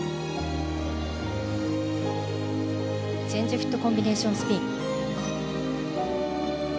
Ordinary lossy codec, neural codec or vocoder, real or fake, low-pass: none; none; real; none